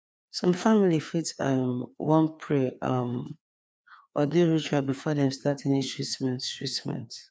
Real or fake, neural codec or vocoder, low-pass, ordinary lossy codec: fake; codec, 16 kHz, 2 kbps, FreqCodec, larger model; none; none